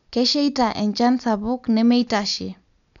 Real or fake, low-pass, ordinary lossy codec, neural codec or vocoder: real; 7.2 kHz; none; none